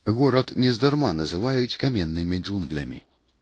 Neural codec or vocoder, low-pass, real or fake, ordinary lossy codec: codec, 16 kHz in and 24 kHz out, 0.9 kbps, LongCat-Audio-Codec, fine tuned four codebook decoder; 10.8 kHz; fake; AAC, 48 kbps